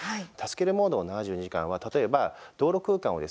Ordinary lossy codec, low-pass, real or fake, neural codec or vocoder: none; none; real; none